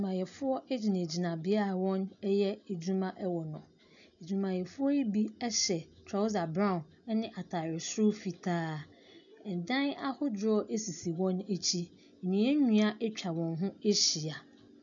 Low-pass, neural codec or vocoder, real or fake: 7.2 kHz; none; real